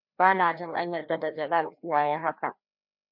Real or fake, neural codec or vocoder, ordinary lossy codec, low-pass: fake; codec, 16 kHz, 1 kbps, FreqCodec, larger model; MP3, 48 kbps; 5.4 kHz